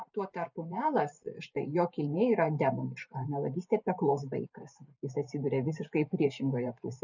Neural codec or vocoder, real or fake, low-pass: vocoder, 44.1 kHz, 128 mel bands every 512 samples, BigVGAN v2; fake; 7.2 kHz